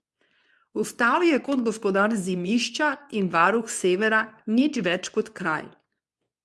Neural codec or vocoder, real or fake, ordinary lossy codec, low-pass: codec, 24 kHz, 0.9 kbps, WavTokenizer, medium speech release version 2; fake; none; none